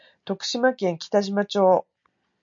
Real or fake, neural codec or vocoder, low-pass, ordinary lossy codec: real; none; 7.2 kHz; MP3, 48 kbps